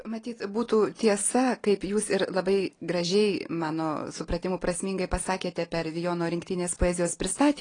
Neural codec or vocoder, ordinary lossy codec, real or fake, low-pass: none; AAC, 32 kbps; real; 9.9 kHz